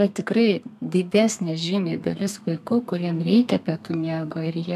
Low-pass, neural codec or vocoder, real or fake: 14.4 kHz; codec, 32 kHz, 1.9 kbps, SNAC; fake